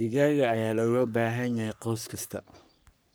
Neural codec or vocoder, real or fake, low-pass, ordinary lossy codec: codec, 44.1 kHz, 3.4 kbps, Pupu-Codec; fake; none; none